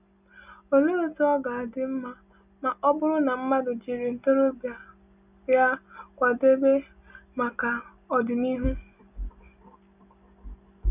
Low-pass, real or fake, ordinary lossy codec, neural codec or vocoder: 3.6 kHz; real; none; none